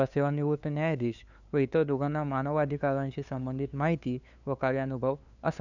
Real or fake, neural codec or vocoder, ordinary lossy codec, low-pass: fake; codec, 16 kHz, 2 kbps, FunCodec, trained on LibriTTS, 25 frames a second; none; 7.2 kHz